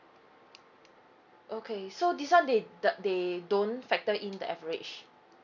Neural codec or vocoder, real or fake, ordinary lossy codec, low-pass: none; real; none; 7.2 kHz